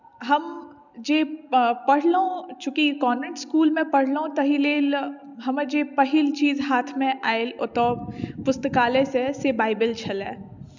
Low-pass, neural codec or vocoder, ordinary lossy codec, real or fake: 7.2 kHz; none; none; real